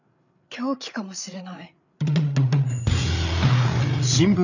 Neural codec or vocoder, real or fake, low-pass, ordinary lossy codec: codec, 16 kHz, 8 kbps, FreqCodec, larger model; fake; 7.2 kHz; none